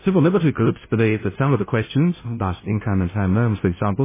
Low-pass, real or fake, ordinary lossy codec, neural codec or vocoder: 3.6 kHz; fake; MP3, 16 kbps; codec, 16 kHz, 0.5 kbps, FunCodec, trained on Chinese and English, 25 frames a second